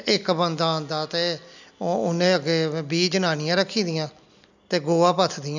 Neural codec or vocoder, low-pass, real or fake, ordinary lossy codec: none; 7.2 kHz; real; none